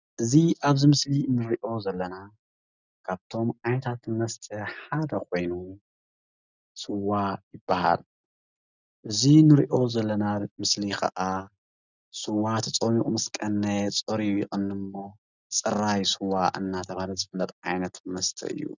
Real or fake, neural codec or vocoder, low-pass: real; none; 7.2 kHz